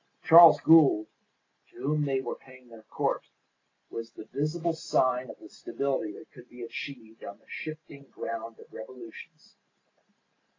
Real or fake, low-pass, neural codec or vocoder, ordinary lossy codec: real; 7.2 kHz; none; AAC, 32 kbps